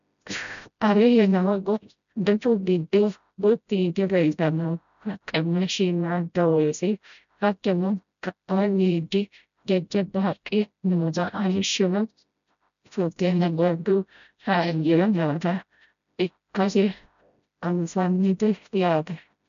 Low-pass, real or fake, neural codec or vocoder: 7.2 kHz; fake; codec, 16 kHz, 0.5 kbps, FreqCodec, smaller model